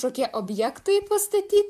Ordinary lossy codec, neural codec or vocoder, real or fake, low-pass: MP3, 96 kbps; vocoder, 44.1 kHz, 128 mel bands, Pupu-Vocoder; fake; 14.4 kHz